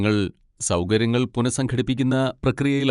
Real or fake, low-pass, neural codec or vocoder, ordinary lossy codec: fake; 10.8 kHz; vocoder, 24 kHz, 100 mel bands, Vocos; none